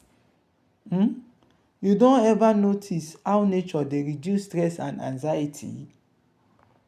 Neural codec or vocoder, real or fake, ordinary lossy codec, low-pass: none; real; none; 14.4 kHz